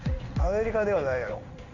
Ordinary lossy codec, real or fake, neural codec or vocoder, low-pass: none; fake; codec, 16 kHz in and 24 kHz out, 1 kbps, XY-Tokenizer; 7.2 kHz